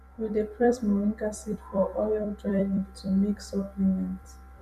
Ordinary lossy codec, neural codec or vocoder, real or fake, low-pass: none; vocoder, 44.1 kHz, 128 mel bands every 256 samples, BigVGAN v2; fake; 14.4 kHz